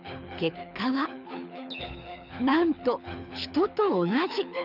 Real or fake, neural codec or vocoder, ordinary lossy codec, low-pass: fake; codec, 24 kHz, 6 kbps, HILCodec; none; 5.4 kHz